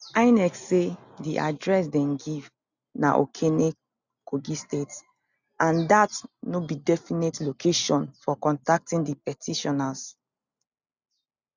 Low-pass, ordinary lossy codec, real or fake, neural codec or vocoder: 7.2 kHz; none; real; none